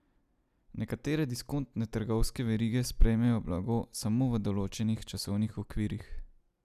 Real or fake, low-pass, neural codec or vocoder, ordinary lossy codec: real; 14.4 kHz; none; none